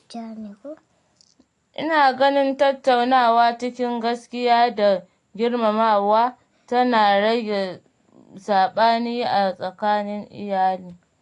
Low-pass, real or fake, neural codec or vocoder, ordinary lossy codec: 10.8 kHz; real; none; AAC, 48 kbps